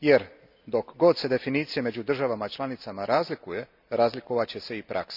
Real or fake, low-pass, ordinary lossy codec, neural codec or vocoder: real; 5.4 kHz; none; none